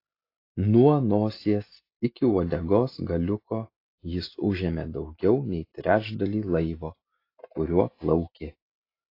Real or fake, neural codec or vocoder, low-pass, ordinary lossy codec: real; none; 5.4 kHz; AAC, 32 kbps